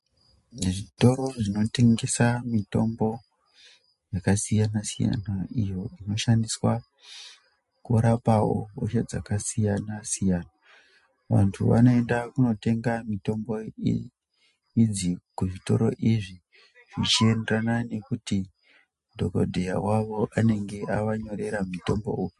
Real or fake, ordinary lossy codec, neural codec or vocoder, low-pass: real; MP3, 48 kbps; none; 14.4 kHz